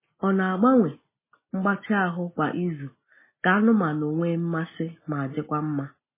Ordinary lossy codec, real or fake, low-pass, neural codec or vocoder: MP3, 16 kbps; real; 3.6 kHz; none